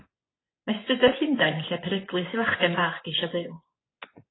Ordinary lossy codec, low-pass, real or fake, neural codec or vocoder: AAC, 16 kbps; 7.2 kHz; fake; vocoder, 24 kHz, 100 mel bands, Vocos